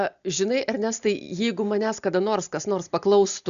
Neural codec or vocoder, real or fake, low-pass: none; real; 7.2 kHz